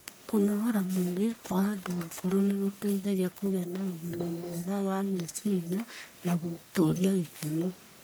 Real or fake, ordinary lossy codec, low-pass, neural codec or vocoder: fake; none; none; codec, 44.1 kHz, 1.7 kbps, Pupu-Codec